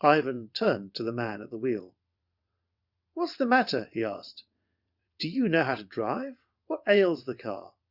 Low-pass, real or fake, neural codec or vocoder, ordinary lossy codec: 5.4 kHz; fake; vocoder, 22.05 kHz, 80 mel bands, WaveNeXt; Opus, 64 kbps